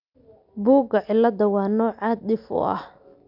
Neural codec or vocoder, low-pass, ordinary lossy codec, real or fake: none; 5.4 kHz; none; real